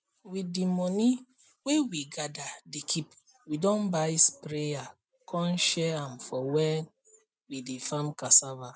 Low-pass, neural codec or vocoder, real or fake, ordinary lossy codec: none; none; real; none